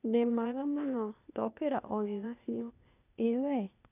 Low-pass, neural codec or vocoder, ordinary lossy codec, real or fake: 3.6 kHz; codec, 16 kHz, 0.8 kbps, ZipCodec; none; fake